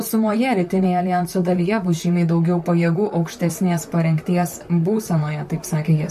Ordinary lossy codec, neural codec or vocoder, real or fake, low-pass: MP3, 96 kbps; vocoder, 44.1 kHz, 128 mel bands, Pupu-Vocoder; fake; 14.4 kHz